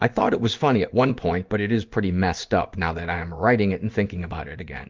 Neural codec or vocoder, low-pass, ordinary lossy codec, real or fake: codec, 16 kHz in and 24 kHz out, 1 kbps, XY-Tokenizer; 7.2 kHz; Opus, 24 kbps; fake